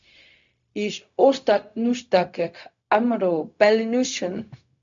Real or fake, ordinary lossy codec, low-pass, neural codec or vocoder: fake; AAC, 64 kbps; 7.2 kHz; codec, 16 kHz, 0.4 kbps, LongCat-Audio-Codec